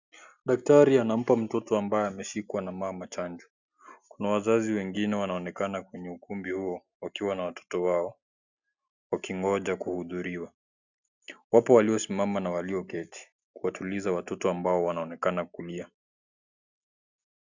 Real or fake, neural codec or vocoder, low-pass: real; none; 7.2 kHz